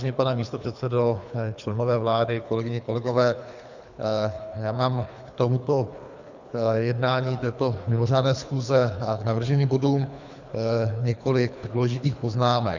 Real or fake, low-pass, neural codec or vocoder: fake; 7.2 kHz; codec, 24 kHz, 3 kbps, HILCodec